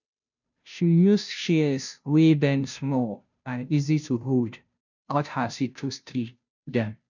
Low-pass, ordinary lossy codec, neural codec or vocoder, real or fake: 7.2 kHz; none; codec, 16 kHz, 0.5 kbps, FunCodec, trained on Chinese and English, 25 frames a second; fake